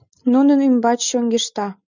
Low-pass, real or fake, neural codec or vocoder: 7.2 kHz; real; none